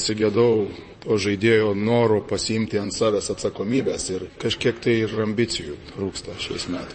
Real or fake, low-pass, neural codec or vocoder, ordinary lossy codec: fake; 10.8 kHz; vocoder, 44.1 kHz, 128 mel bands, Pupu-Vocoder; MP3, 32 kbps